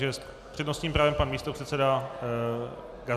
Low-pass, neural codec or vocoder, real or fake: 14.4 kHz; none; real